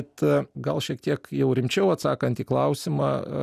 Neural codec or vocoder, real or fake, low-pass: none; real; 14.4 kHz